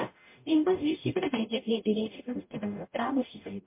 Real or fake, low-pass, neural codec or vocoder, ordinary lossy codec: fake; 3.6 kHz; codec, 44.1 kHz, 0.9 kbps, DAC; MP3, 24 kbps